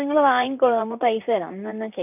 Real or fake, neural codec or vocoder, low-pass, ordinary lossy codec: fake; vocoder, 44.1 kHz, 128 mel bands, Pupu-Vocoder; 3.6 kHz; none